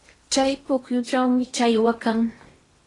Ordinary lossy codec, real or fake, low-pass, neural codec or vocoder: AAC, 32 kbps; fake; 10.8 kHz; codec, 16 kHz in and 24 kHz out, 0.8 kbps, FocalCodec, streaming, 65536 codes